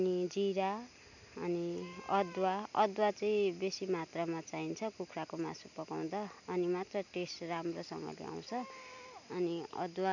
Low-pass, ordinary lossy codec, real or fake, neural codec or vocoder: 7.2 kHz; none; real; none